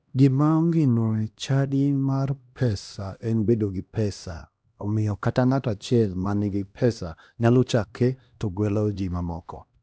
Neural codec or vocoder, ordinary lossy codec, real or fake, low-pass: codec, 16 kHz, 1 kbps, X-Codec, HuBERT features, trained on LibriSpeech; none; fake; none